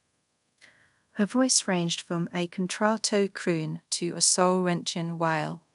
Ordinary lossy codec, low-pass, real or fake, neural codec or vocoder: none; 10.8 kHz; fake; codec, 24 kHz, 0.5 kbps, DualCodec